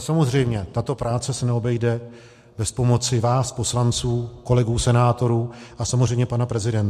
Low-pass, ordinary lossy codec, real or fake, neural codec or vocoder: 14.4 kHz; MP3, 64 kbps; real; none